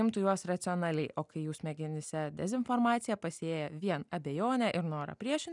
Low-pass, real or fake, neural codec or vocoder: 10.8 kHz; real; none